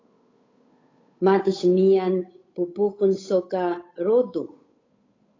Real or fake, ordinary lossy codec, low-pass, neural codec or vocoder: fake; AAC, 32 kbps; 7.2 kHz; codec, 16 kHz, 8 kbps, FunCodec, trained on Chinese and English, 25 frames a second